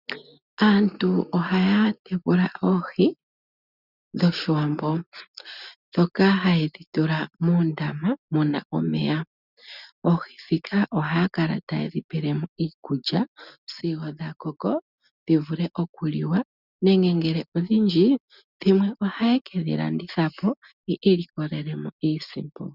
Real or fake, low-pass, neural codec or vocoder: real; 5.4 kHz; none